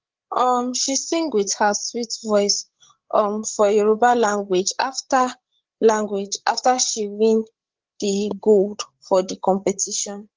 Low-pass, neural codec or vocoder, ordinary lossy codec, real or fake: 7.2 kHz; codec, 16 kHz, 8 kbps, FreqCodec, larger model; Opus, 16 kbps; fake